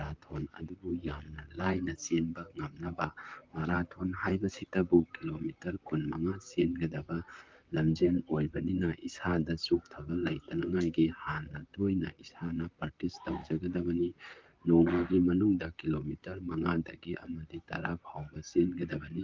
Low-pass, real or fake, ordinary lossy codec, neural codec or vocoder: 7.2 kHz; fake; Opus, 24 kbps; vocoder, 44.1 kHz, 128 mel bands, Pupu-Vocoder